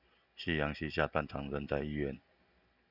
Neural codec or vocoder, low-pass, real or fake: none; 5.4 kHz; real